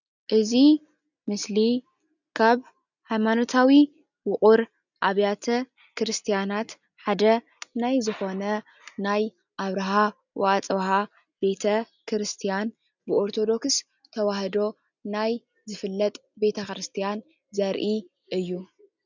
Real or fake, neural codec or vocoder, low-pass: real; none; 7.2 kHz